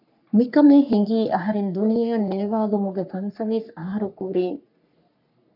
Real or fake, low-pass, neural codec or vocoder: fake; 5.4 kHz; codec, 44.1 kHz, 3.4 kbps, Pupu-Codec